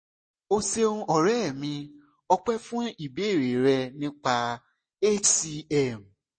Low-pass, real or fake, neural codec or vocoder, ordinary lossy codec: 9.9 kHz; real; none; MP3, 32 kbps